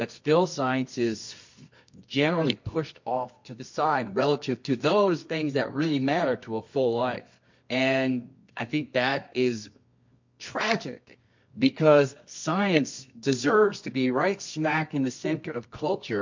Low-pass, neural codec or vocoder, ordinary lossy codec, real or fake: 7.2 kHz; codec, 24 kHz, 0.9 kbps, WavTokenizer, medium music audio release; MP3, 48 kbps; fake